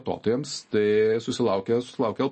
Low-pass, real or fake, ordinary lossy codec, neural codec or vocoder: 10.8 kHz; real; MP3, 32 kbps; none